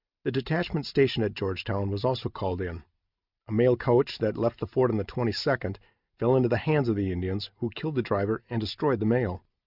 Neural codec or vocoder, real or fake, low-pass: none; real; 5.4 kHz